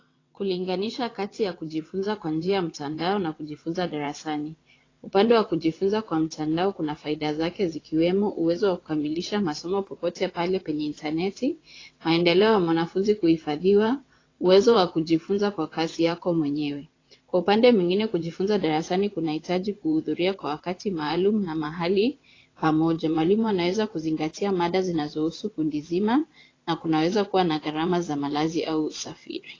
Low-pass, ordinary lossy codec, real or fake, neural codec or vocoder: 7.2 kHz; AAC, 32 kbps; fake; vocoder, 22.05 kHz, 80 mel bands, WaveNeXt